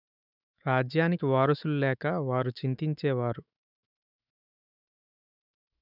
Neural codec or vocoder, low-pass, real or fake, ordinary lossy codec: autoencoder, 48 kHz, 128 numbers a frame, DAC-VAE, trained on Japanese speech; 5.4 kHz; fake; none